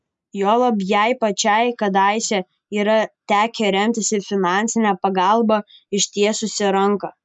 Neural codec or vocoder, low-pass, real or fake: none; 10.8 kHz; real